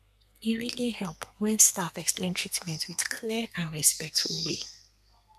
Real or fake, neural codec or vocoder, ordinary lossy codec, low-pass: fake; codec, 32 kHz, 1.9 kbps, SNAC; none; 14.4 kHz